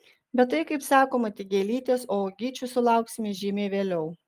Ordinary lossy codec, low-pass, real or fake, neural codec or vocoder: Opus, 32 kbps; 14.4 kHz; real; none